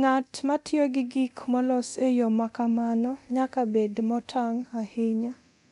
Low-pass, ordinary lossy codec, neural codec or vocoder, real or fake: 10.8 kHz; none; codec, 24 kHz, 0.9 kbps, DualCodec; fake